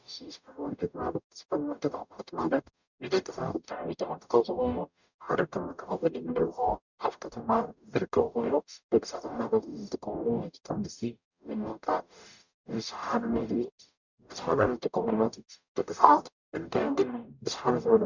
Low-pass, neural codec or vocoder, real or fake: 7.2 kHz; codec, 44.1 kHz, 0.9 kbps, DAC; fake